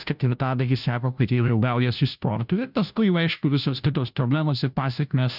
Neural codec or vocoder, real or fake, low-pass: codec, 16 kHz, 0.5 kbps, FunCodec, trained on Chinese and English, 25 frames a second; fake; 5.4 kHz